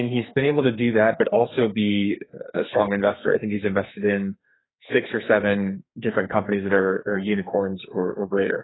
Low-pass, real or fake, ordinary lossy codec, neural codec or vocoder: 7.2 kHz; fake; AAC, 16 kbps; codec, 44.1 kHz, 2.6 kbps, SNAC